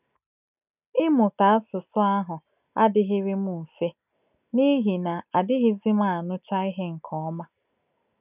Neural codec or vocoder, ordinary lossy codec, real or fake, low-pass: none; none; real; 3.6 kHz